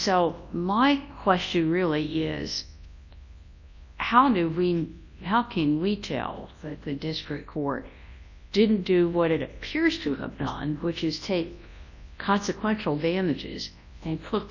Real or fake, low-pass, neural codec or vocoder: fake; 7.2 kHz; codec, 24 kHz, 0.9 kbps, WavTokenizer, large speech release